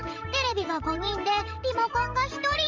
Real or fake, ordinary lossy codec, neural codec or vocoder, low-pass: fake; Opus, 32 kbps; vocoder, 44.1 kHz, 128 mel bands every 512 samples, BigVGAN v2; 7.2 kHz